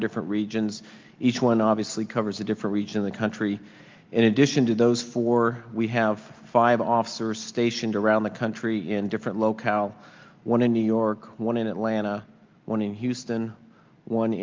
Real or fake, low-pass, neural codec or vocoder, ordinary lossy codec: real; 7.2 kHz; none; Opus, 24 kbps